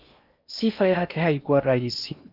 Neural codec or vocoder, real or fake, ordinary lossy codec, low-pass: codec, 16 kHz in and 24 kHz out, 0.8 kbps, FocalCodec, streaming, 65536 codes; fake; Opus, 64 kbps; 5.4 kHz